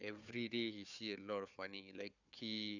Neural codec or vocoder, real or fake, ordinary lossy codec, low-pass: codec, 16 kHz, 4 kbps, FunCodec, trained on Chinese and English, 50 frames a second; fake; none; 7.2 kHz